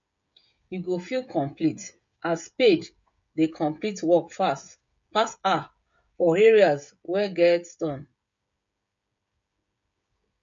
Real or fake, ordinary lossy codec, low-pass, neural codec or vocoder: fake; MP3, 48 kbps; 7.2 kHz; codec, 16 kHz, 16 kbps, FreqCodec, smaller model